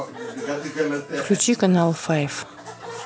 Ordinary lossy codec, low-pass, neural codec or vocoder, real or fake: none; none; none; real